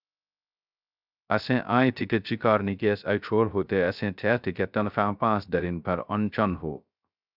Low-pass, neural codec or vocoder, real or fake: 5.4 kHz; codec, 16 kHz, 0.3 kbps, FocalCodec; fake